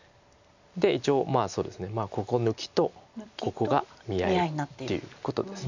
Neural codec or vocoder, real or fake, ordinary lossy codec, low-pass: none; real; none; 7.2 kHz